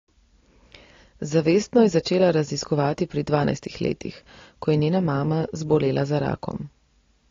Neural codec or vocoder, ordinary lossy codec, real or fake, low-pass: none; AAC, 32 kbps; real; 7.2 kHz